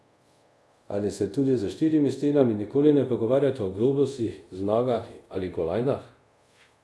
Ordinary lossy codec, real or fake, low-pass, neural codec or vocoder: none; fake; none; codec, 24 kHz, 0.5 kbps, DualCodec